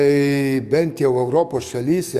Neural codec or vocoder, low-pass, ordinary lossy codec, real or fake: codec, 44.1 kHz, 7.8 kbps, DAC; 14.4 kHz; Opus, 64 kbps; fake